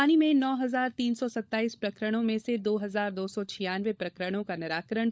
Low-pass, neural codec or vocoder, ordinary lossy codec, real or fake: none; codec, 16 kHz, 16 kbps, FunCodec, trained on LibriTTS, 50 frames a second; none; fake